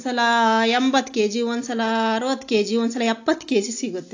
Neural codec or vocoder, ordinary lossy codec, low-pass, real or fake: none; AAC, 48 kbps; 7.2 kHz; real